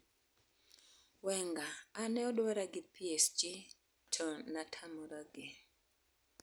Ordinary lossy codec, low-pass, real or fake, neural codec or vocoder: none; none; real; none